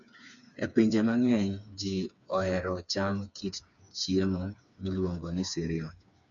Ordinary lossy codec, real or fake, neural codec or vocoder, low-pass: none; fake; codec, 16 kHz, 4 kbps, FreqCodec, smaller model; 7.2 kHz